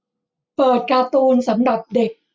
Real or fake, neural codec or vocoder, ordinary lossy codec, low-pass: real; none; none; none